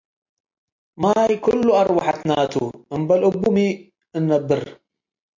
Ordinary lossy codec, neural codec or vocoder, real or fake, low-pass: MP3, 48 kbps; none; real; 7.2 kHz